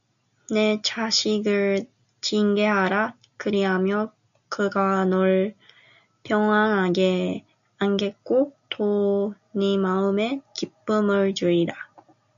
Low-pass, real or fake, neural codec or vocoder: 7.2 kHz; real; none